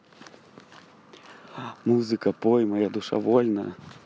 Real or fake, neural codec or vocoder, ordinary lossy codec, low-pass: real; none; none; none